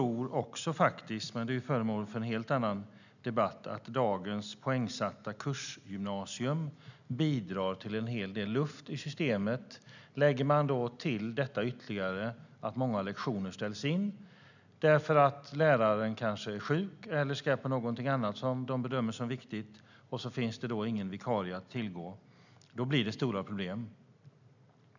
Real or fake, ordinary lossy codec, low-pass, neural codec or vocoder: real; none; 7.2 kHz; none